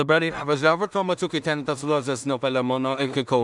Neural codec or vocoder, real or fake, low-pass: codec, 16 kHz in and 24 kHz out, 0.4 kbps, LongCat-Audio-Codec, two codebook decoder; fake; 10.8 kHz